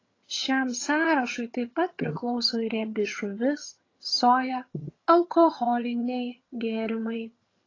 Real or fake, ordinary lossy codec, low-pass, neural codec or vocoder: fake; AAC, 32 kbps; 7.2 kHz; vocoder, 22.05 kHz, 80 mel bands, HiFi-GAN